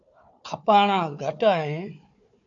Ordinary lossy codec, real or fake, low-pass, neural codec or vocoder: AAC, 64 kbps; fake; 7.2 kHz; codec, 16 kHz, 4 kbps, FunCodec, trained on Chinese and English, 50 frames a second